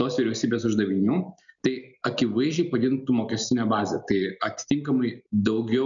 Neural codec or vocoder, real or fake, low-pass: none; real; 7.2 kHz